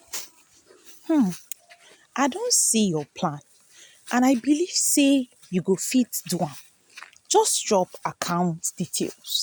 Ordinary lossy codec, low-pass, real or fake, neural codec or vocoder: none; none; real; none